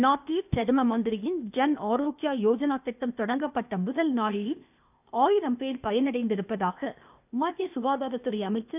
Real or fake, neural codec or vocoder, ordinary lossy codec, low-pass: fake; codec, 16 kHz, 0.8 kbps, ZipCodec; none; 3.6 kHz